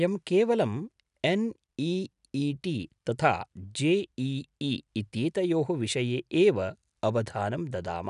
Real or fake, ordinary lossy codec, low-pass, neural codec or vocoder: real; none; 10.8 kHz; none